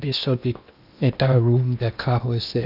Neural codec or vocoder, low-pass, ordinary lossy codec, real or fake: codec, 16 kHz in and 24 kHz out, 0.8 kbps, FocalCodec, streaming, 65536 codes; 5.4 kHz; none; fake